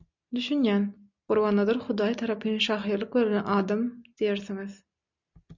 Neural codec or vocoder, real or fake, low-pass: none; real; 7.2 kHz